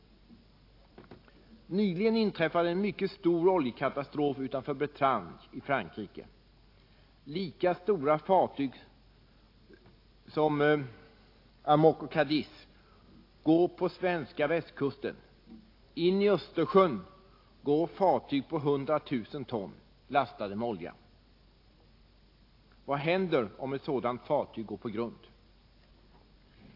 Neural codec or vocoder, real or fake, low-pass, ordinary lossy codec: none; real; 5.4 kHz; AAC, 32 kbps